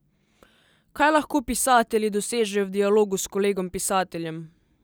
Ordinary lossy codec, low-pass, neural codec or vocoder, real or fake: none; none; none; real